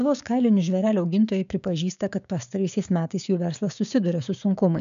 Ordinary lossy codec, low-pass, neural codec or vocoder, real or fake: AAC, 96 kbps; 7.2 kHz; codec, 16 kHz, 6 kbps, DAC; fake